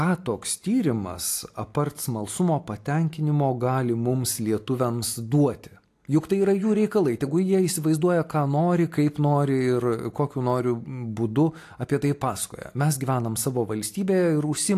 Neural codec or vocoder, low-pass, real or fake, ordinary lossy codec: none; 14.4 kHz; real; AAC, 64 kbps